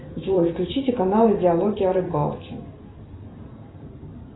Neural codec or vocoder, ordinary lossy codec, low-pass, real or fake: vocoder, 44.1 kHz, 128 mel bands every 512 samples, BigVGAN v2; AAC, 16 kbps; 7.2 kHz; fake